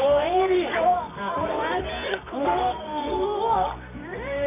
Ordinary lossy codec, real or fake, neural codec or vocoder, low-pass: none; fake; codec, 24 kHz, 0.9 kbps, WavTokenizer, medium music audio release; 3.6 kHz